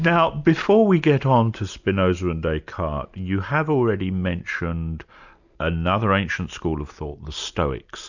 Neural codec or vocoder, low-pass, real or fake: none; 7.2 kHz; real